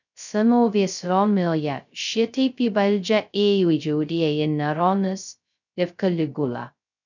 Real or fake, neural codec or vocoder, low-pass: fake; codec, 16 kHz, 0.2 kbps, FocalCodec; 7.2 kHz